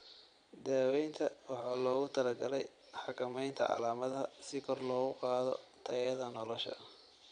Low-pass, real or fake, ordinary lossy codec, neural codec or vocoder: 9.9 kHz; fake; none; vocoder, 22.05 kHz, 80 mel bands, Vocos